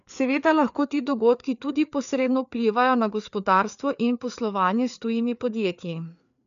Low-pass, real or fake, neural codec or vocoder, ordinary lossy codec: 7.2 kHz; fake; codec, 16 kHz, 4 kbps, FreqCodec, larger model; none